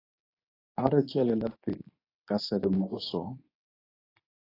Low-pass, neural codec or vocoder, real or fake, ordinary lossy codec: 5.4 kHz; codec, 24 kHz, 0.9 kbps, WavTokenizer, medium speech release version 2; fake; AAC, 32 kbps